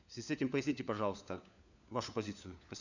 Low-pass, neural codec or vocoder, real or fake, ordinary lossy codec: 7.2 kHz; codec, 16 kHz, 4 kbps, FunCodec, trained on LibriTTS, 50 frames a second; fake; none